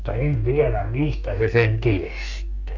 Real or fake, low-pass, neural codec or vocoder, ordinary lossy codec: fake; 7.2 kHz; codec, 44.1 kHz, 2.6 kbps, DAC; none